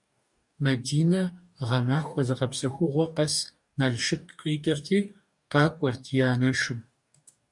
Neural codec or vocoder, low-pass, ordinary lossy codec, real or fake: codec, 44.1 kHz, 2.6 kbps, DAC; 10.8 kHz; MP3, 96 kbps; fake